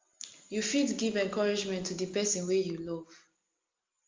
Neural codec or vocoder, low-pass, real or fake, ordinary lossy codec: none; 7.2 kHz; real; Opus, 32 kbps